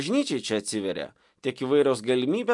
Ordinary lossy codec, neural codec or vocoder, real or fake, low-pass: MP3, 64 kbps; none; real; 10.8 kHz